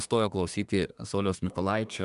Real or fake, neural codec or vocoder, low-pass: fake; codec, 24 kHz, 1 kbps, SNAC; 10.8 kHz